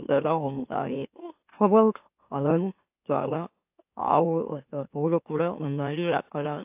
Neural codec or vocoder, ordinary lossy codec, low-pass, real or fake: autoencoder, 44.1 kHz, a latent of 192 numbers a frame, MeloTTS; AAC, 32 kbps; 3.6 kHz; fake